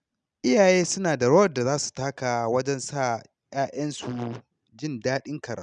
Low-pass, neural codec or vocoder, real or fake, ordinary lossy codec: 9.9 kHz; none; real; none